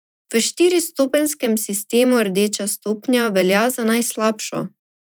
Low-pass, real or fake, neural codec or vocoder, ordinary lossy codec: none; fake; vocoder, 44.1 kHz, 128 mel bands every 512 samples, BigVGAN v2; none